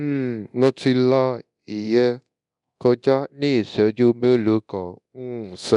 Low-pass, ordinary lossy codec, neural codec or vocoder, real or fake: 10.8 kHz; none; codec, 24 kHz, 0.9 kbps, DualCodec; fake